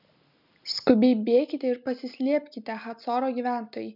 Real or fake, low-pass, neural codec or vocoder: real; 5.4 kHz; none